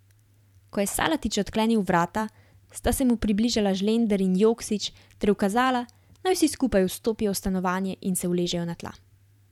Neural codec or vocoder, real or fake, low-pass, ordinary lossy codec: vocoder, 44.1 kHz, 128 mel bands every 512 samples, BigVGAN v2; fake; 19.8 kHz; none